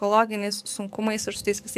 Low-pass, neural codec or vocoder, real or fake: 14.4 kHz; codec, 44.1 kHz, 7.8 kbps, DAC; fake